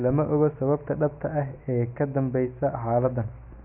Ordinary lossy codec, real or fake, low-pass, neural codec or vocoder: none; real; 3.6 kHz; none